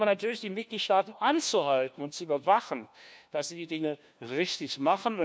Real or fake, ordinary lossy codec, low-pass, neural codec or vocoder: fake; none; none; codec, 16 kHz, 1 kbps, FunCodec, trained on LibriTTS, 50 frames a second